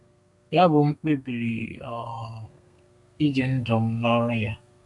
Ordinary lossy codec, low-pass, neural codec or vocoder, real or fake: none; 10.8 kHz; codec, 32 kHz, 1.9 kbps, SNAC; fake